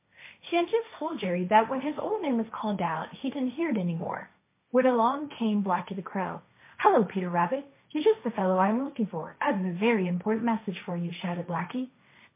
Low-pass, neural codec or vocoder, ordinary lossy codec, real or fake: 3.6 kHz; codec, 16 kHz, 1.1 kbps, Voila-Tokenizer; MP3, 24 kbps; fake